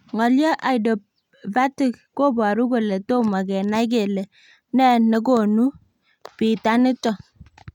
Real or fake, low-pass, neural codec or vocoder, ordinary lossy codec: real; 19.8 kHz; none; none